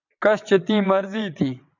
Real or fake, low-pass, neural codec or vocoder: fake; 7.2 kHz; vocoder, 22.05 kHz, 80 mel bands, WaveNeXt